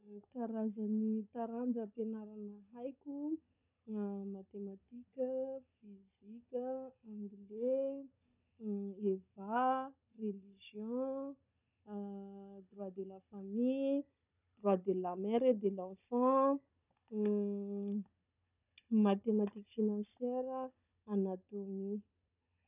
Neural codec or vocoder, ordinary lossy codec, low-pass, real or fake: codec, 16 kHz, 16 kbps, FunCodec, trained on Chinese and English, 50 frames a second; none; 3.6 kHz; fake